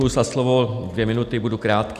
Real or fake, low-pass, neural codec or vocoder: real; 14.4 kHz; none